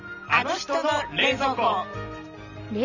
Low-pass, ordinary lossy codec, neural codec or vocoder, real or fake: 7.2 kHz; none; none; real